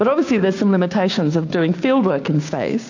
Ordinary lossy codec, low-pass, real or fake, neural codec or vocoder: AAC, 48 kbps; 7.2 kHz; fake; codec, 16 kHz, 6 kbps, DAC